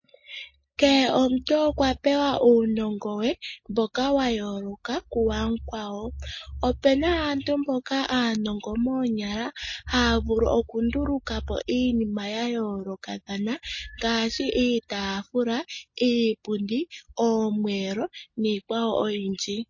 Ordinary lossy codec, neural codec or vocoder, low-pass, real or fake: MP3, 32 kbps; none; 7.2 kHz; real